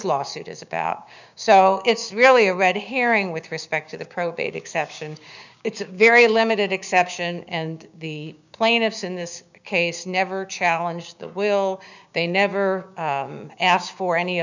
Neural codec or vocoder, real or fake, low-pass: codec, 16 kHz, 6 kbps, DAC; fake; 7.2 kHz